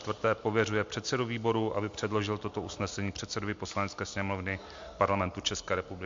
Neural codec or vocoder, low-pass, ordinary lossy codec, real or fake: none; 7.2 kHz; MP3, 48 kbps; real